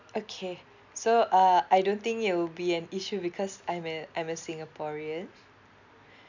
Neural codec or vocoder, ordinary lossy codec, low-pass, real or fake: none; none; 7.2 kHz; real